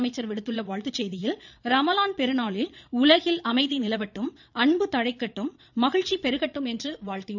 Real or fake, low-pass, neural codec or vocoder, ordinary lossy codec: fake; 7.2 kHz; vocoder, 22.05 kHz, 80 mel bands, Vocos; none